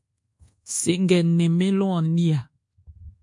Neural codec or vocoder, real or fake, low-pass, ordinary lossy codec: codec, 24 kHz, 1.2 kbps, DualCodec; fake; 10.8 kHz; AAC, 64 kbps